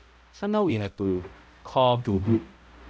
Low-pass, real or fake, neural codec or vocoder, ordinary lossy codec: none; fake; codec, 16 kHz, 0.5 kbps, X-Codec, HuBERT features, trained on balanced general audio; none